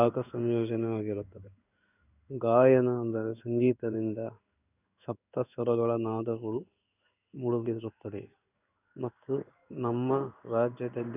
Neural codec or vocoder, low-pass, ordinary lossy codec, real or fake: codec, 16 kHz in and 24 kHz out, 1 kbps, XY-Tokenizer; 3.6 kHz; none; fake